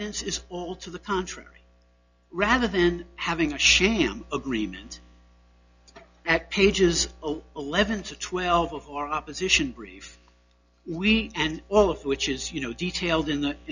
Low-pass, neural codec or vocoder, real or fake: 7.2 kHz; none; real